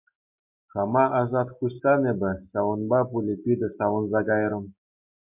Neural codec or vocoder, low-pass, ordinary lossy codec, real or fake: none; 3.6 kHz; MP3, 32 kbps; real